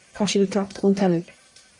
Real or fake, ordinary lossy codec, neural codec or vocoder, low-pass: fake; MP3, 64 kbps; codec, 44.1 kHz, 1.7 kbps, Pupu-Codec; 10.8 kHz